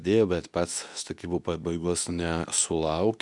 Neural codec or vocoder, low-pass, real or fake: codec, 24 kHz, 0.9 kbps, WavTokenizer, medium speech release version 2; 10.8 kHz; fake